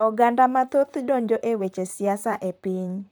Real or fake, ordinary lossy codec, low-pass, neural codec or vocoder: fake; none; none; vocoder, 44.1 kHz, 128 mel bands, Pupu-Vocoder